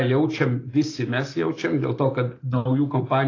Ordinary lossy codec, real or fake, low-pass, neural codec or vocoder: AAC, 32 kbps; real; 7.2 kHz; none